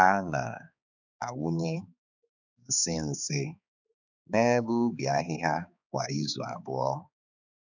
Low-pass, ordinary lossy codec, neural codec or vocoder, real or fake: 7.2 kHz; none; codec, 16 kHz, 4 kbps, X-Codec, HuBERT features, trained on balanced general audio; fake